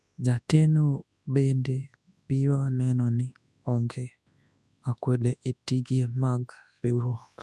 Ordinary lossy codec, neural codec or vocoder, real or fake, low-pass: none; codec, 24 kHz, 0.9 kbps, WavTokenizer, large speech release; fake; none